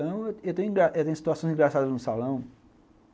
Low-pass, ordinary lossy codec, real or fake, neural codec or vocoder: none; none; real; none